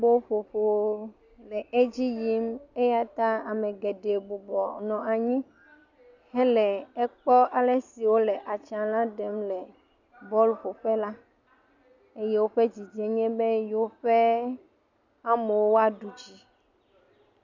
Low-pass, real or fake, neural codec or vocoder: 7.2 kHz; real; none